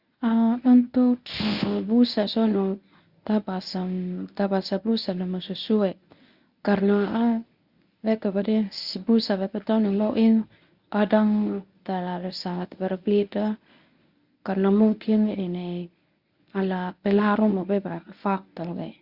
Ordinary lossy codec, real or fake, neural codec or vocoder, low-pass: Opus, 64 kbps; fake; codec, 24 kHz, 0.9 kbps, WavTokenizer, medium speech release version 1; 5.4 kHz